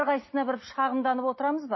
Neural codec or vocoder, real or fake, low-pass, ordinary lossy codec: none; real; 7.2 kHz; MP3, 24 kbps